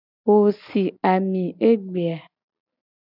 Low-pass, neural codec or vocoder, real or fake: 5.4 kHz; none; real